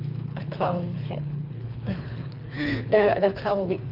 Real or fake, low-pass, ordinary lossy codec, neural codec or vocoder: fake; 5.4 kHz; none; codec, 24 kHz, 3 kbps, HILCodec